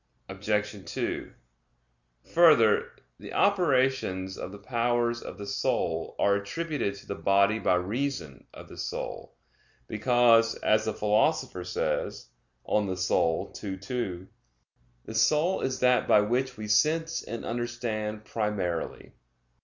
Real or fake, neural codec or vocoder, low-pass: real; none; 7.2 kHz